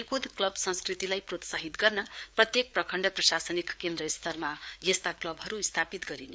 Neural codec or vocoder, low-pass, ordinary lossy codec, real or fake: codec, 16 kHz, 4 kbps, FreqCodec, larger model; none; none; fake